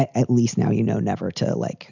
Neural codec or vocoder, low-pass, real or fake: none; 7.2 kHz; real